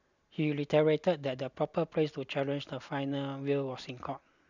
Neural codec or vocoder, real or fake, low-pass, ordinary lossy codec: none; real; 7.2 kHz; none